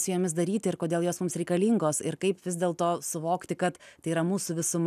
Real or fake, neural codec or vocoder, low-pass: real; none; 14.4 kHz